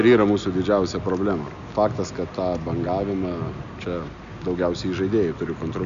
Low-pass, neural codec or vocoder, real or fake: 7.2 kHz; none; real